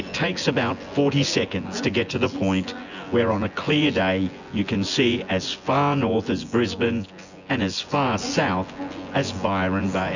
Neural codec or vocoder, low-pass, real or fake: vocoder, 24 kHz, 100 mel bands, Vocos; 7.2 kHz; fake